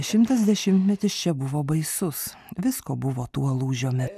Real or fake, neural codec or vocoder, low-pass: real; none; 14.4 kHz